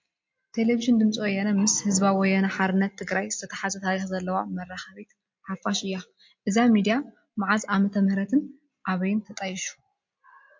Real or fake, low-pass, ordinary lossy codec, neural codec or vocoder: real; 7.2 kHz; MP3, 48 kbps; none